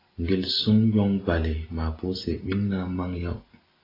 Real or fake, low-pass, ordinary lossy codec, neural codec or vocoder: real; 5.4 kHz; AAC, 24 kbps; none